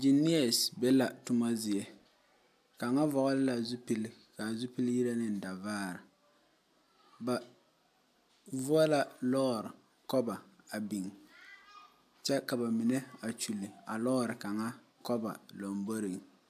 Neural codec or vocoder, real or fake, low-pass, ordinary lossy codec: none; real; 14.4 kHz; AAC, 96 kbps